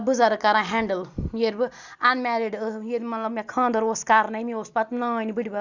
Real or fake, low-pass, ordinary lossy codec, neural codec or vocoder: real; 7.2 kHz; Opus, 64 kbps; none